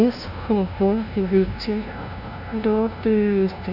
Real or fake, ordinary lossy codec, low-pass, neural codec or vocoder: fake; none; 5.4 kHz; codec, 16 kHz, 0.5 kbps, FunCodec, trained on LibriTTS, 25 frames a second